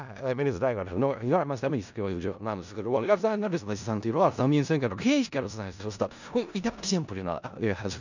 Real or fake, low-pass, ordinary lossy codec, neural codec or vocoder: fake; 7.2 kHz; none; codec, 16 kHz in and 24 kHz out, 0.4 kbps, LongCat-Audio-Codec, four codebook decoder